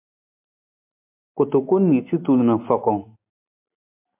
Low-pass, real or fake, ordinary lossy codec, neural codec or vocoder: 3.6 kHz; real; MP3, 32 kbps; none